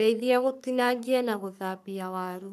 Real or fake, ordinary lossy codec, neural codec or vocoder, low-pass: fake; none; codec, 44.1 kHz, 3.4 kbps, Pupu-Codec; 14.4 kHz